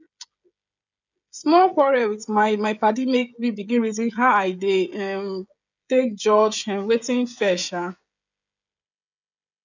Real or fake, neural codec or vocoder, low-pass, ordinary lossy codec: fake; codec, 16 kHz, 16 kbps, FreqCodec, smaller model; 7.2 kHz; none